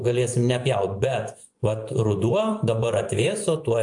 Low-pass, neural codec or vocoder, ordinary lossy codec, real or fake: 10.8 kHz; vocoder, 24 kHz, 100 mel bands, Vocos; AAC, 64 kbps; fake